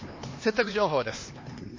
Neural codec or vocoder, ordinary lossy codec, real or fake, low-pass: codec, 16 kHz, 2 kbps, X-Codec, HuBERT features, trained on LibriSpeech; MP3, 32 kbps; fake; 7.2 kHz